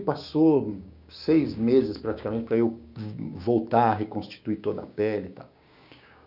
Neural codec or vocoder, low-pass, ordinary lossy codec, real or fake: codec, 16 kHz, 6 kbps, DAC; 5.4 kHz; none; fake